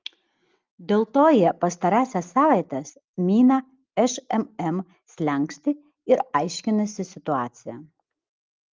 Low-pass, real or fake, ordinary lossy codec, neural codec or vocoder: 7.2 kHz; real; Opus, 32 kbps; none